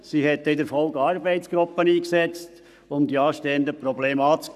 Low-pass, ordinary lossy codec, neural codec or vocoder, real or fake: 14.4 kHz; none; codec, 44.1 kHz, 7.8 kbps, Pupu-Codec; fake